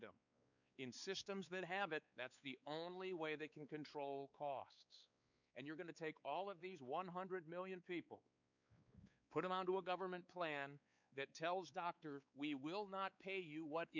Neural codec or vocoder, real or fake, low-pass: codec, 16 kHz, 4 kbps, X-Codec, WavLM features, trained on Multilingual LibriSpeech; fake; 7.2 kHz